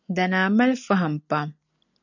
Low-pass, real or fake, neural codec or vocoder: 7.2 kHz; real; none